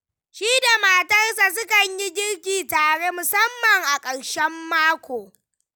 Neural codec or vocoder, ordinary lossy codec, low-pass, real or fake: none; none; none; real